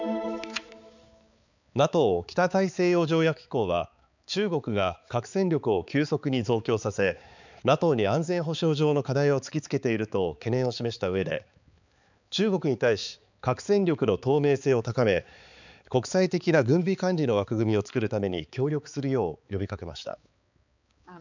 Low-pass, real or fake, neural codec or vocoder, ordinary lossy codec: 7.2 kHz; fake; codec, 16 kHz, 4 kbps, X-Codec, HuBERT features, trained on balanced general audio; none